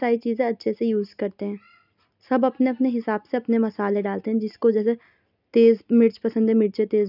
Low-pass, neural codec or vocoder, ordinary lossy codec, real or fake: 5.4 kHz; none; none; real